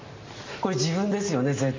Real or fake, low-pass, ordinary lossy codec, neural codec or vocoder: real; 7.2 kHz; MP3, 32 kbps; none